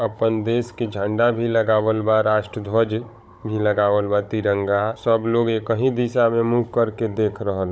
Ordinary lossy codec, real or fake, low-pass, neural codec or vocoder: none; fake; none; codec, 16 kHz, 16 kbps, FunCodec, trained on Chinese and English, 50 frames a second